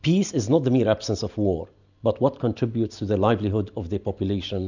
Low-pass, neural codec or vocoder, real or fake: 7.2 kHz; none; real